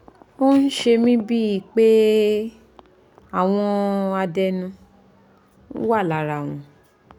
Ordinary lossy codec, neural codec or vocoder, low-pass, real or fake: none; none; 19.8 kHz; real